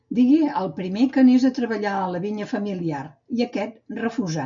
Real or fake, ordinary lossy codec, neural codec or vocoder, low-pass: real; MP3, 64 kbps; none; 7.2 kHz